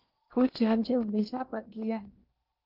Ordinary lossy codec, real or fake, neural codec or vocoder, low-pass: Opus, 32 kbps; fake; codec, 16 kHz in and 24 kHz out, 0.8 kbps, FocalCodec, streaming, 65536 codes; 5.4 kHz